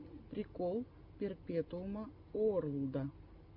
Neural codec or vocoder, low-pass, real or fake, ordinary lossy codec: none; 5.4 kHz; real; MP3, 48 kbps